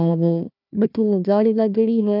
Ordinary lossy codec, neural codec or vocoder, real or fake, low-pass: none; codec, 16 kHz, 1 kbps, FunCodec, trained on Chinese and English, 50 frames a second; fake; 5.4 kHz